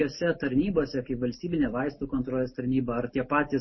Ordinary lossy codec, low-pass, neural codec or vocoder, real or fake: MP3, 24 kbps; 7.2 kHz; none; real